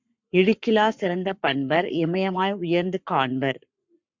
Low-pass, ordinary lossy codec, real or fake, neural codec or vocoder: 7.2 kHz; MP3, 64 kbps; fake; codec, 44.1 kHz, 7.8 kbps, Pupu-Codec